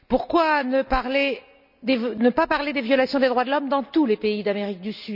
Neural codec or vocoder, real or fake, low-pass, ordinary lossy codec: none; real; 5.4 kHz; none